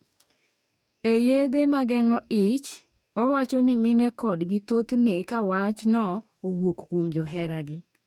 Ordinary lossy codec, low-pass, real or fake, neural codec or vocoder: none; 19.8 kHz; fake; codec, 44.1 kHz, 2.6 kbps, DAC